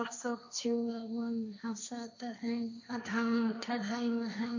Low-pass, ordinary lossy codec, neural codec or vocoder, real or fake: 7.2 kHz; none; codec, 16 kHz, 1.1 kbps, Voila-Tokenizer; fake